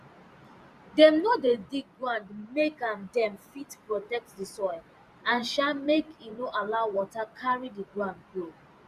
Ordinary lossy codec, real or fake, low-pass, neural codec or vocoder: Opus, 64 kbps; fake; 14.4 kHz; vocoder, 44.1 kHz, 128 mel bands every 512 samples, BigVGAN v2